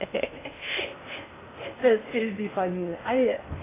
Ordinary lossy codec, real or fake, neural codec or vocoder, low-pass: AAC, 16 kbps; fake; codec, 16 kHz in and 24 kHz out, 0.6 kbps, FocalCodec, streaming, 2048 codes; 3.6 kHz